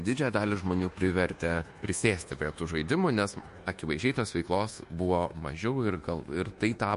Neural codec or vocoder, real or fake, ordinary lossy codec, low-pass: codec, 24 kHz, 1.2 kbps, DualCodec; fake; MP3, 48 kbps; 10.8 kHz